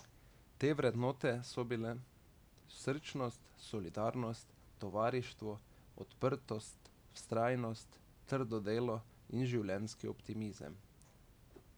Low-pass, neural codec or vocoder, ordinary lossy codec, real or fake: none; none; none; real